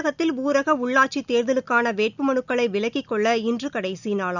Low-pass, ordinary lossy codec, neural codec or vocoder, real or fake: 7.2 kHz; none; none; real